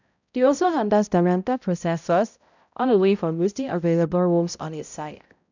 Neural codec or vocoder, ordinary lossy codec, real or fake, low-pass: codec, 16 kHz, 0.5 kbps, X-Codec, HuBERT features, trained on balanced general audio; none; fake; 7.2 kHz